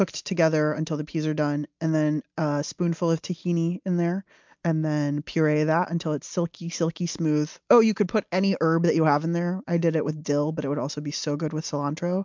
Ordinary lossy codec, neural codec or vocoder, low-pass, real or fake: MP3, 64 kbps; none; 7.2 kHz; real